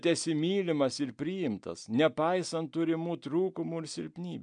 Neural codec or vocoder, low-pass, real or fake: none; 9.9 kHz; real